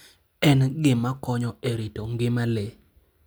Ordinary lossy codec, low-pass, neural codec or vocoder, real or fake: none; none; none; real